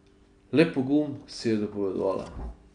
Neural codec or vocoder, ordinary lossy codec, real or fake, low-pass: none; none; real; 9.9 kHz